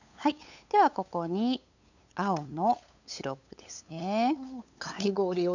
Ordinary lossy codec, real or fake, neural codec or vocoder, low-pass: none; fake; codec, 16 kHz, 8 kbps, FunCodec, trained on LibriTTS, 25 frames a second; 7.2 kHz